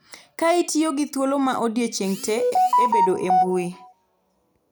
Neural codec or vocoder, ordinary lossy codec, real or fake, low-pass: none; none; real; none